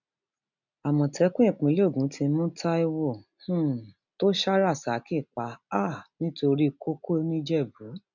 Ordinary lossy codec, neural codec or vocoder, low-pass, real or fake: none; none; 7.2 kHz; real